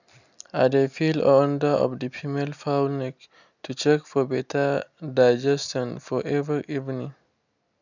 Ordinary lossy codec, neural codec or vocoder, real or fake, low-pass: none; none; real; 7.2 kHz